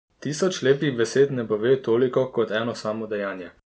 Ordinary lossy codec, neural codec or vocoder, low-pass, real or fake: none; none; none; real